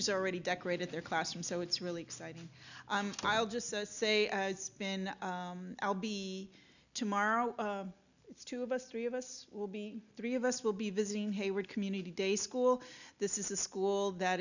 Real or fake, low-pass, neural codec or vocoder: real; 7.2 kHz; none